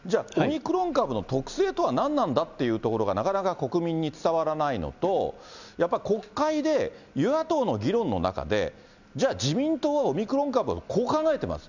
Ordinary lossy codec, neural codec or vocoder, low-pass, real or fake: none; none; 7.2 kHz; real